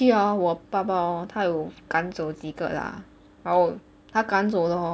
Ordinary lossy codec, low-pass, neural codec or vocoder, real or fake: none; none; none; real